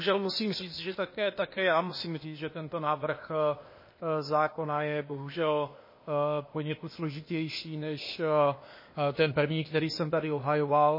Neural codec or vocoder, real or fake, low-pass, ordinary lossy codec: codec, 16 kHz, 0.8 kbps, ZipCodec; fake; 5.4 kHz; MP3, 24 kbps